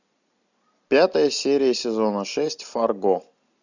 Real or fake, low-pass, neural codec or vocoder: real; 7.2 kHz; none